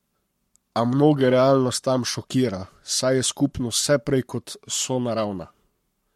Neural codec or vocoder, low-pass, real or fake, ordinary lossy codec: codec, 44.1 kHz, 7.8 kbps, Pupu-Codec; 19.8 kHz; fake; MP3, 64 kbps